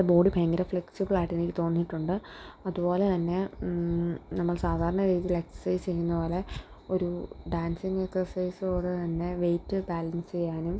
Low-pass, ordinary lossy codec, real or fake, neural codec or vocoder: none; none; real; none